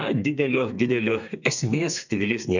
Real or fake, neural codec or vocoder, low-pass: fake; codec, 32 kHz, 1.9 kbps, SNAC; 7.2 kHz